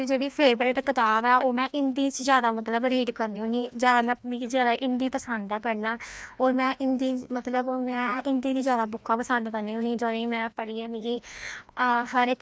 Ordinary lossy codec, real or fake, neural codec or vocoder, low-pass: none; fake; codec, 16 kHz, 1 kbps, FreqCodec, larger model; none